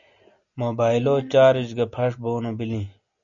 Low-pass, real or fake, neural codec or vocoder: 7.2 kHz; real; none